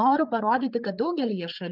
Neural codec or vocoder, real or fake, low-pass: codec, 16 kHz, 8 kbps, FreqCodec, larger model; fake; 5.4 kHz